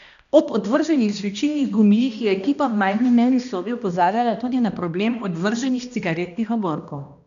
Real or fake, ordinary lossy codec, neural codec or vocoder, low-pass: fake; none; codec, 16 kHz, 1 kbps, X-Codec, HuBERT features, trained on balanced general audio; 7.2 kHz